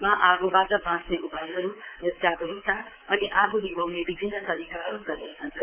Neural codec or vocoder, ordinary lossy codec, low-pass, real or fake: codec, 24 kHz, 3.1 kbps, DualCodec; none; 3.6 kHz; fake